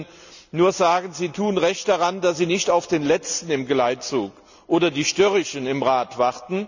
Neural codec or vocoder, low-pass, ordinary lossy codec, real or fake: none; 7.2 kHz; none; real